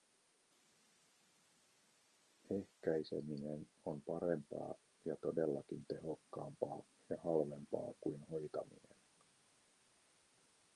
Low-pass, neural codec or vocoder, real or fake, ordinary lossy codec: 10.8 kHz; none; real; Opus, 32 kbps